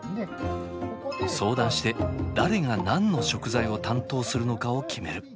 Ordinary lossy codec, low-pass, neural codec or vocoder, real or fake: none; none; none; real